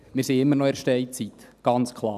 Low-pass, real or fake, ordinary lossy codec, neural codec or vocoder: 14.4 kHz; real; none; none